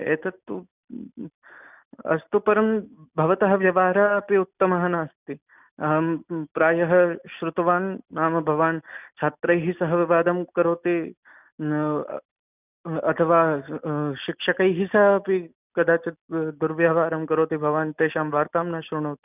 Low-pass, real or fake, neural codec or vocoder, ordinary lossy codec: 3.6 kHz; real; none; none